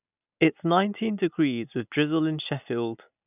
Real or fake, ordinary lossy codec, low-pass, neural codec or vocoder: real; none; 3.6 kHz; none